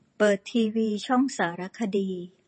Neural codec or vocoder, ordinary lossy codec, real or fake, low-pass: vocoder, 48 kHz, 128 mel bands, Vocos; MP3, 32 kbps; fake; 10.8 kHz